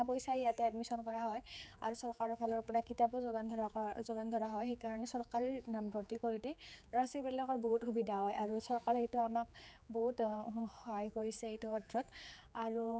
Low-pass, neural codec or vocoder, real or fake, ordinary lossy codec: none; codec, 16 kHz, 4 kbps, X-Codec, HuBERT features, trained on general audio; fake; none